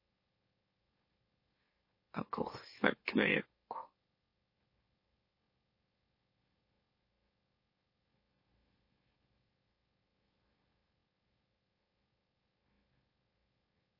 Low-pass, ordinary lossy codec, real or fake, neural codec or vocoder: 5.4 kHz; MP3, 24 kbps; fake; autoencoder, 44.1 kHz, a latent of 192 numbers a frame, MeloTTS